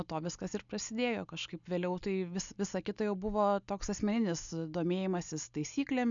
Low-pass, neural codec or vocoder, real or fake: 7.2 kHz; none; real